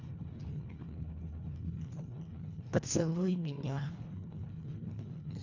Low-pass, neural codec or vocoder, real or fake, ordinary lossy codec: 7.2 kHz; codec, 24 kHz, 1.5 kbps, HILCodec; fake; none